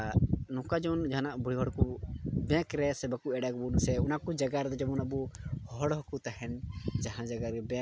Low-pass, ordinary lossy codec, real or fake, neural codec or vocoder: none; none; real; none